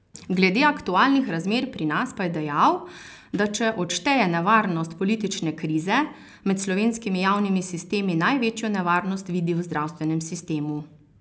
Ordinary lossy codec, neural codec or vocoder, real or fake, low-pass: none; none; real; none